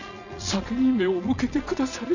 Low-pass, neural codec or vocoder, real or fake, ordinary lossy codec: 7.2 kHz; vocoder, 22.05 kHz, 80 mel bands, Vocos; fake; none